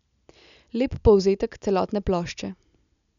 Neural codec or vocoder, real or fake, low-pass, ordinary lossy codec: none; real; 7.2 kHz; none